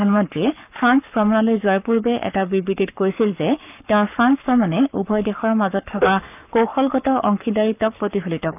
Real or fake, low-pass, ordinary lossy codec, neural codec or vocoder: fake; 3.6 kHz; none; codec, 44.1 kHz, 7.8 kbps, Pupu-Codec